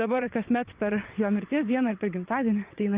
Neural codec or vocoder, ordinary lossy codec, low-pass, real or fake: vocoder, 22.05 kHz, 80 mel bands, WaveNeXt; Opus, 24 kbps; 3.6 kHz; fake